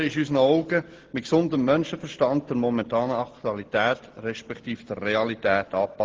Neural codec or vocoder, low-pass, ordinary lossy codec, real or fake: none; 7.2 kHz; Opus, 16 kbps; real